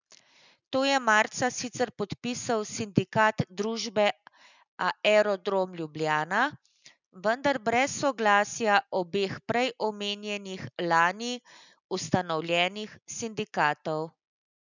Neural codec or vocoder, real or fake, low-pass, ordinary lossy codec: none; real; 7.2 kHz; none